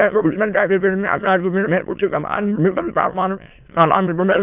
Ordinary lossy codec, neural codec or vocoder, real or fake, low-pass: AAC, 32 kbps; autoencoder, 22.05 kHz, a latent of 192 numbers a frame, VITS, trained on many speakers; fake; 3.6 kHz